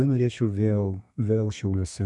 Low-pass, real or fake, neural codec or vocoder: 10.8 kHz; fake; codec, 32 kHz, 1.9 kbps, SNAC